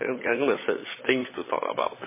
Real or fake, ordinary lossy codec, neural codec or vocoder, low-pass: fake; MP3, 16 kbps; codec, 16 kHz, 16 kbps, FunCodec, trained on LibriTTS, 50 frames a second; 3.6 kHz